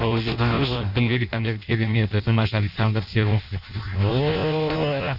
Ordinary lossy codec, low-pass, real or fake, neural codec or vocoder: none; 5.4 kHz; fake; codec, 16 kHz in and 24 kHz out, 0.6 kbps, FireRedTTS-2 codec